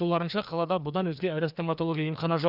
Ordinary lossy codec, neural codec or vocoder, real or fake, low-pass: none; codec, 16 kHz, 2 kbps, FreqCodec, larger model; fake; 5.4 kHz